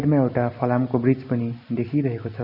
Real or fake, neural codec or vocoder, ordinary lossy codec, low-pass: real; none; none; 5.4 kHz